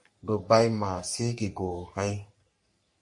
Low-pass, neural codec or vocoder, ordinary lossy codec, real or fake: 10.8 kHz; codec, 44.1 kHz, 3.4 kbps, Pupu-Codec; MP3, 48 kbps; fake